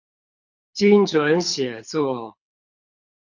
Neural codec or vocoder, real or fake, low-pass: codec, 24 kHz, 6 kbps, HILCodec; fake; 7.2 kHz